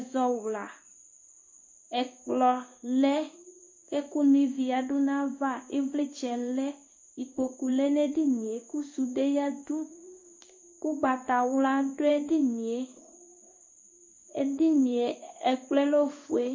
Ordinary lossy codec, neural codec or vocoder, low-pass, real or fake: MP3, 32 kbps; codec, 16 kHz in and 24 kHz out, 1 kbps, XY-Tokenizer; 7.2 kHz; fake